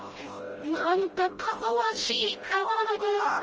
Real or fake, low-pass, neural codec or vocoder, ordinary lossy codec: fake; 7.2 kHz; codec, 16 kHz, 0.5 kbps, FreqCodec, smaller model; Opus, 24 kbps